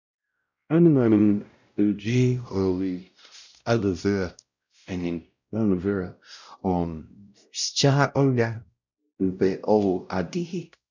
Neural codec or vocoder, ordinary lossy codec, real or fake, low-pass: codec, 16 kHz, 0.5 kbps, X-Codec, WavLM features, trained on Multilingual LibriSpeech; none; fake; 7.2 kHz